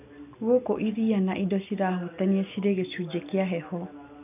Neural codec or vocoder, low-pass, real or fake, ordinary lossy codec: none; 3.6 kHz; real; none